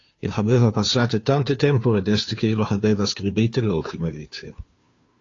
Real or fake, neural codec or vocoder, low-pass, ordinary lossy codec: fake; codec, 16 kHz, 2 kbps, FunCodec, trained on Chinese and English, 25 frames a second; 7.2 kHz; AAC, 32 kbps